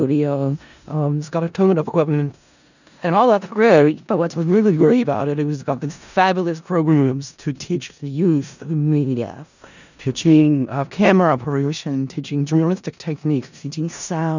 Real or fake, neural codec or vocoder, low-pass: fake; codec, 16 kHz in and 24 kHz out, 0.4 kbps, LongCat-Audio-Codec, four codebook decoder; 7.2 kHz